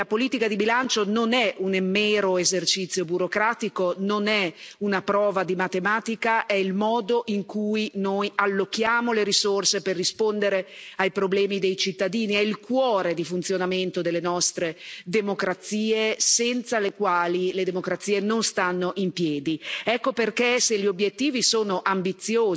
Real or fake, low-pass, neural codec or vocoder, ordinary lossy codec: real; none; none; none